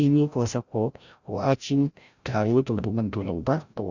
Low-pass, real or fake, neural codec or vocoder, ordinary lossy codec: 7.2 kHz; fake; codec, 16 kHz, 0.5 kbps, FreqCodec, larger model; Opus, 64 kbps